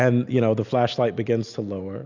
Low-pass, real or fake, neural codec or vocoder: 7.2 kHz; real; none